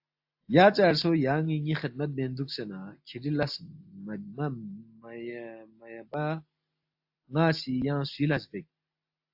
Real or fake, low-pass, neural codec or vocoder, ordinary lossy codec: real; 5.4 kHz; none; AAC, 48 kbps